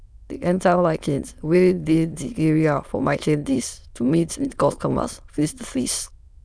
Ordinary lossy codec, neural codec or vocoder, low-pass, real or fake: none; autoencoder, 22.05 kHz, a latent of 192 numbers a frame, VITS, trained on many speakers; none; fake